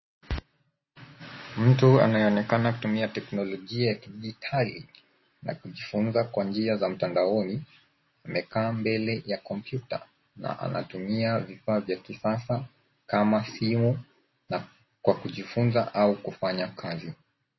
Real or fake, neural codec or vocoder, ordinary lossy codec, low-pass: real; none; MP3, 24 kbps; 7.2 kHz